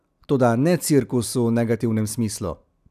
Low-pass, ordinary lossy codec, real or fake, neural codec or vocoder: 14.4 kHz; none; real; none